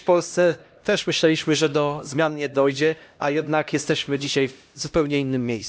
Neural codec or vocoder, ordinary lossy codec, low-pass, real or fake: codec, 16 kHz, 1 kbps, X-Codec, HuBERT features, trained on LibriSpeech; none; none; fake